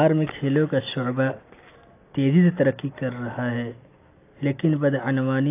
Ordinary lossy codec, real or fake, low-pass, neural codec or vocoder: AAC, 24 kbps; real; 3.6 kHz; none